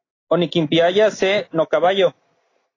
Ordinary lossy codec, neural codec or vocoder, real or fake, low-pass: AAC, 32 kbps; none; real; 7.2 kHz